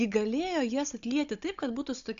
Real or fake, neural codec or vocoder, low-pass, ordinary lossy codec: fake; codec, 16 kHz, 16 kbps, FunCodec, trained on Chinese and English, 50 frames a second; 7.2 kHz; AAC, 64 kbps